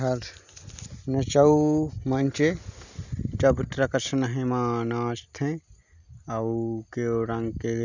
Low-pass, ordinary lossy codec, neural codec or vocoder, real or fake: 7.2 kHz; none; none; real